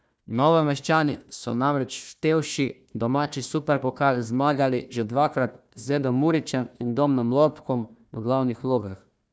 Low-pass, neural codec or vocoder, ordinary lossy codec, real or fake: none; codec, 16 kHz, 1 kbps, FunCodec, trained on Chinese and English, 50 frames a second; none; fake